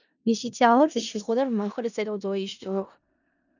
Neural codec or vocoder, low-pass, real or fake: codec, 16 kHz in and 24 kHz out, 0.4 kbps, LongCat-Audio-Codec, four codebook decoder; 7.2 kHz; fake